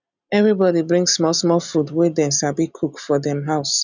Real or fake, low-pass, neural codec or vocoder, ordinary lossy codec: real; 7.2 kHz; none; none